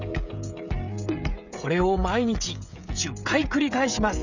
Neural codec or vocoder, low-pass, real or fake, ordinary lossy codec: codec, 16 kHz, 16 kbps, FreqCodec, smaller model; 7.2 kHz; fake; none